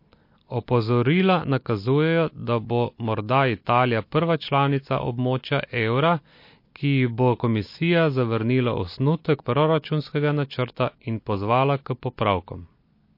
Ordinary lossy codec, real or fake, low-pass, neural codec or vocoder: MP3, 32 kbps; real; 5.4 kHz; none